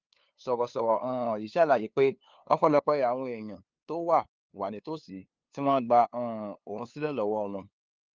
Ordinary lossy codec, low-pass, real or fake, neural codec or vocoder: Opus, 32 kbps; 7.2 kHz; fake; codec, 16 kHz, 2 kbps, FunCodec, trained on LibriTTS, 25 frames a second